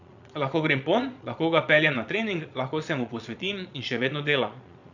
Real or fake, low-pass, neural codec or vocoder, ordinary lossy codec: fake; 7.2 kHz; vocoder, 22.05 kHz, 80 mel bands, Vocos; none